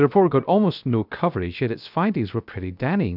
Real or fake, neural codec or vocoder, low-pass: fake; codec, 16 kHz, 0.3 kbps, FocalCodec; 5.4 kHz